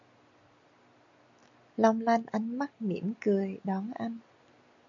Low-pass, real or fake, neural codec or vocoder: 7.2 kHz; real; none